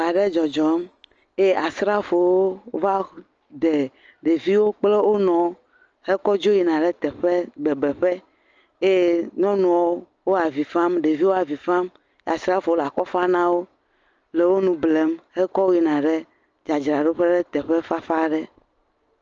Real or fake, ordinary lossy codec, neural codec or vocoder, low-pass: real; Opus, 24 kbps; none; 7.2 kHz